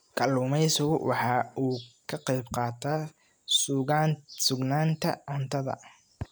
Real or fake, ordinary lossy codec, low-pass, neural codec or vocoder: real; none; none; none